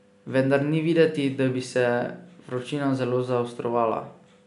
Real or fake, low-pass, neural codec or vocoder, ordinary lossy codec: real; 10.8 kHz; none; none